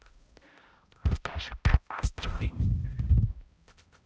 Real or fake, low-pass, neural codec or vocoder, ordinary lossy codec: fake; none; codec, 16 kHz, 0.5 kbps, X-Codec, HuBERT features, trained on balanced general audio; none